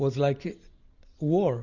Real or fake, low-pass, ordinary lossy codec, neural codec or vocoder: real; 7.2 kHz; Opus, 64 kbps; none